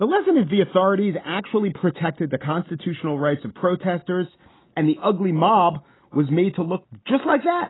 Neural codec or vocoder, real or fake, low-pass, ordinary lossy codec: none; real; 7.2 kHz; AAC, 16 kbps